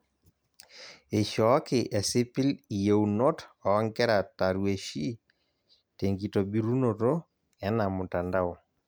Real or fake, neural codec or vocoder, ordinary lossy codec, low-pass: real; none; none; none